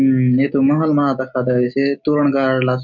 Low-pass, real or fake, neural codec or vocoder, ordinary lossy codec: 7.2 kHz; real; none; none